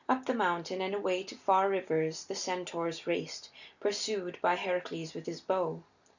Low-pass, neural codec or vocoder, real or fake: 7.2 kHz; none; real